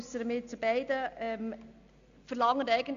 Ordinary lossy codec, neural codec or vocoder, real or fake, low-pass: AAC, 64 kbps; none; real; 7.2 kHz